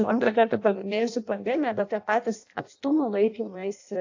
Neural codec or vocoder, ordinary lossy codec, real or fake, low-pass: codec, 16 kHz in and 24 kHz out, 0.6 kbps, FireRedTTS-2 codec; AAC, 48 kbps; fake; 7.2 kHz